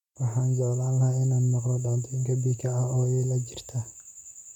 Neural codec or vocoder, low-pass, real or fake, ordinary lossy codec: none; 19.8 kHz; real; MP3, 96 kbps